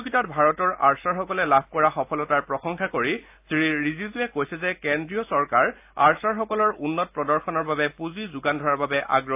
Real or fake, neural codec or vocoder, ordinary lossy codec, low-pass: real; none; AAC, 32 kbps; 3.6 kHz